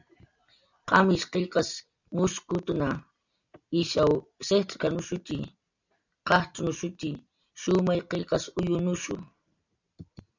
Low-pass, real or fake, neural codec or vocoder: 7.2 kHz; real; none